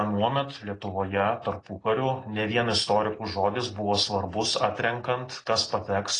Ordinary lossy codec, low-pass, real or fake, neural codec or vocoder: AAC, 32 kbps; 10.8 kHz; real; none